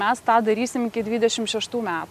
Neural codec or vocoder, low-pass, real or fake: none; 14.4 kHz; real